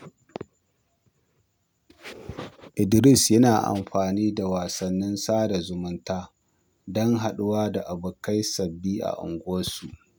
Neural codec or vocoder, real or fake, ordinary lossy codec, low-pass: none; real; none; none